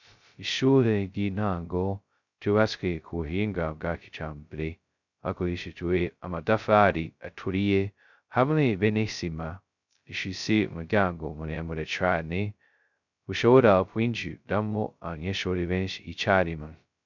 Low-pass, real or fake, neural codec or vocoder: 7.2 kHz; fake; codec, 16 kHz, 0.2 kbps, FocalCodec